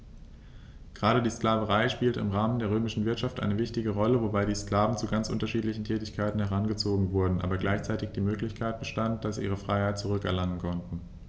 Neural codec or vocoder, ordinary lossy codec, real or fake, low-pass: none; none; real; none